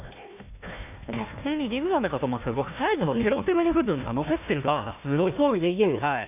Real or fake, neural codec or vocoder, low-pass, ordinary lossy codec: fake; codec, 16 kHz, 1 kbps, FunCodec, trained on Chinese and English, 50 frames a second; 3.6 kHz; none